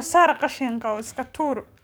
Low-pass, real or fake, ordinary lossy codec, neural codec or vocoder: none; fake; none; codec, 44.1 kHz, 7.8 kbps, DAC